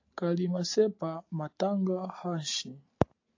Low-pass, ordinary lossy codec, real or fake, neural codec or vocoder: 7.2 kHz; AAC, 48 kbps; real; none